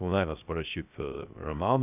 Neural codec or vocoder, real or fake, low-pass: codec, 16 kHz, 0.3 kbps, FocalCodec; fake; 3.6 kHz